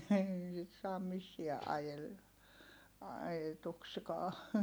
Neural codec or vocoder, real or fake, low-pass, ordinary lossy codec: none; real; none; none